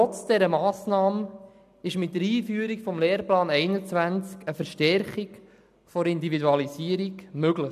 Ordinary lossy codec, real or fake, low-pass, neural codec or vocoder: none; real; 14.4 kHz; none